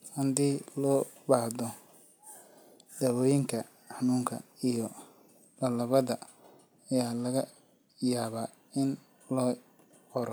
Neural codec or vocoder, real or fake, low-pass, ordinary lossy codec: none; real; none; none